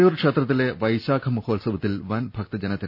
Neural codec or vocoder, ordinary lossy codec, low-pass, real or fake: none; none; 5.4 kHz; real